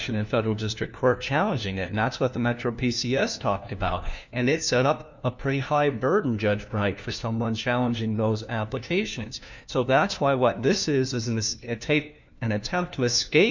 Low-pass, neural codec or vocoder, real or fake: 7.2 kHz; codec, 16 kHz, 1 kbps, FunCodec, trained on LibriTTS, 50 frames a second; fake